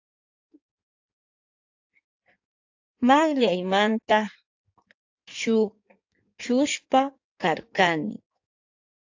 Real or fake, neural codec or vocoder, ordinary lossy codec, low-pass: fake; codec, 16 kHz in and 24 kHz out, 1.1 kbps, FireRedTTS-2 codec; AAC, 48 kbps; 7.2 kHz